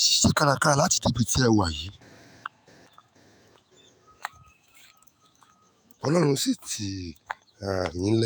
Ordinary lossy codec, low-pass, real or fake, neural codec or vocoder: none; none; fake; autoencoder, 48 kHz, 128 numbers a frame, DAC-VAE, trained on Japanese speech